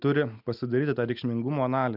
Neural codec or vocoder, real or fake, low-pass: none; real; 5.4 kHz